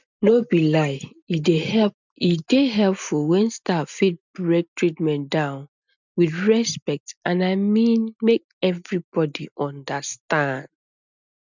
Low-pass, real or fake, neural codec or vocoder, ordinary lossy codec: 7.2 kHz; real; none; none